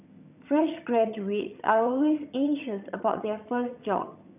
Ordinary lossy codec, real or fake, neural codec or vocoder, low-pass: none; fake; vocoder, 22.05 kHz, 80 mel bands, HiFi-GAN; 3.6 kHz